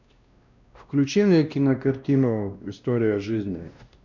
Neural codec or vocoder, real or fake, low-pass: codec, 16 kHz, 1 kbps, X-Codec, WavLM features, trained on Multilingual LibriSpeech; fake; 7.2 kHz